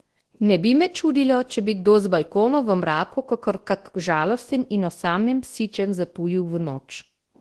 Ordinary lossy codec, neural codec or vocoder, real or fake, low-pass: Opus, 16 kbps; codec, 24 kHz, 0.9 kbps, WavTokenizer, large speech release; fake; 10.8 kHz